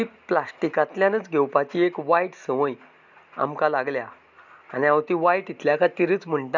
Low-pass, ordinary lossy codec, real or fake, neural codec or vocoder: 7.2 kHz; none; real; none